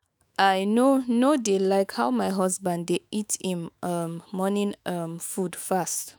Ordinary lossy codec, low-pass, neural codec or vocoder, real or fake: none; none; autoencoder, 48 kHz, 128 numbers a frame, DAC-VAE, trained on Japanese speech; fake